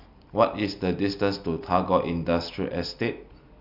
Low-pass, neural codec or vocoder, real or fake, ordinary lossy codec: 5.4 kHz; none; real; none